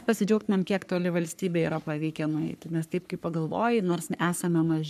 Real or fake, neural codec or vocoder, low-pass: fake; codec, 44.1 kHz, 3.4 kbps, Pupu-Codec; 14.4 kHz